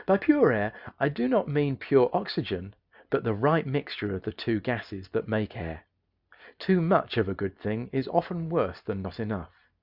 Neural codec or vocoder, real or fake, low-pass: none; real; 5.4 kHz